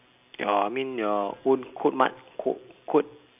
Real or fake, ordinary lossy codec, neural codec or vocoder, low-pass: real; none; none; 3.6 kHz